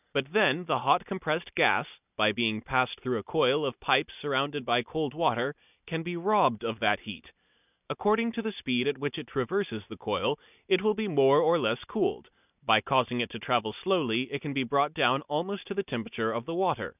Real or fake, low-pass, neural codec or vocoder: real; 3.6 kHz; none